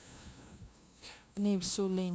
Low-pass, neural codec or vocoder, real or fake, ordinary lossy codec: none; codec, 16 kHz, 0.5 kbps, FunCodec, trained on LibriTTS, 25 frames a second; fake; none